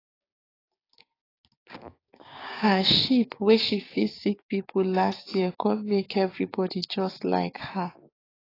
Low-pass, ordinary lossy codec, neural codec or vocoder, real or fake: 5.4 kHz; AAC, 24 kbps; codec, 16 kHz, 6 kbps, DAC; fake